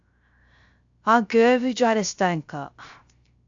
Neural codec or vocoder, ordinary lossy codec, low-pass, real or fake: codec, 16 kHz, 0.3 kbps, FocalCodec; AAC, 64 kbps; 7.2 kHz; fake